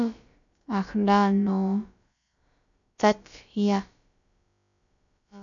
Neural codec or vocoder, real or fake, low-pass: codec, 16 kHz, about 1 kbps, DyCAST, with the encoder's durations; fake; 7.2 kHz